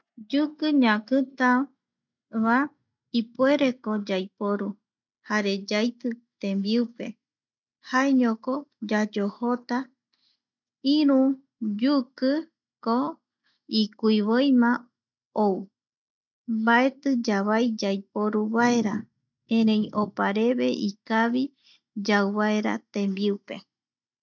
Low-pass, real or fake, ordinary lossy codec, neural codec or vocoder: 7.2 kHz; real; none; none